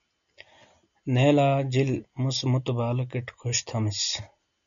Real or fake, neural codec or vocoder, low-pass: real; none; 7.2 kHz